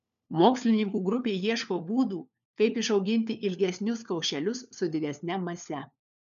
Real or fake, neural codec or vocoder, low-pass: fake; codec, 16 kHz, 4 kbps, FunCodec, trained on LibriTTS, 50 frames a second; 7.2 kHz